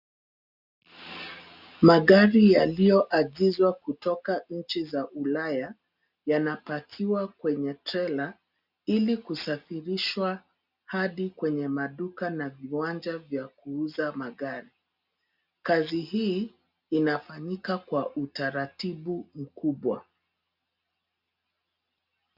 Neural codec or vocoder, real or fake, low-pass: none; real; 5.4 kHz